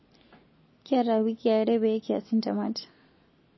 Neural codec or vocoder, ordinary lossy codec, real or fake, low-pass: none; MP3, 24 kbps; real; 7.2 kHz